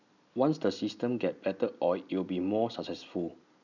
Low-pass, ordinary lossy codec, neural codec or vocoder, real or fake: 7.2 kHz; none; none; real